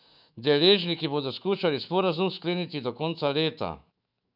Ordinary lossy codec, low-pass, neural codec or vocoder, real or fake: none; 5.4 kHz; autoencoder, 48 kHz, 128 numbers a frame, DAC-VAE, trained on Japanese speech; fake